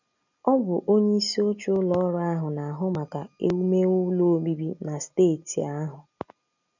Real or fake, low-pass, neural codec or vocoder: real; 7.2 kHz; none